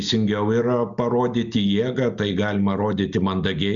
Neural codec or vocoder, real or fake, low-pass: none; real; 7.2 kHz